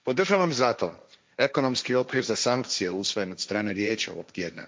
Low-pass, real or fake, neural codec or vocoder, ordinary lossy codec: none; fake; codec, 16 kHz, 1.1 kbps, Voila-Tokenizer; none